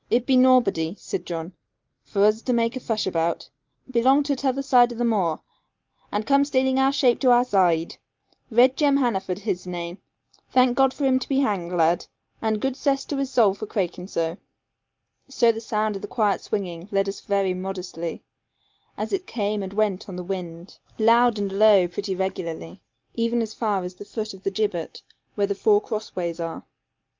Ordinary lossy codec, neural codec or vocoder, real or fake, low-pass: Opus, 32 kbps; none; real; 7.2 kHz